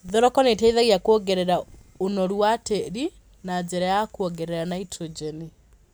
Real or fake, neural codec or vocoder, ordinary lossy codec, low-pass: real; none; none; none